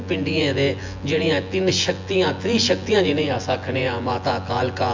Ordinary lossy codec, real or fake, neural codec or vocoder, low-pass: MP3, 64 kbps; fake; vocoder, 24 kHz, 100 mel bands, Vocos; 7.2 kHz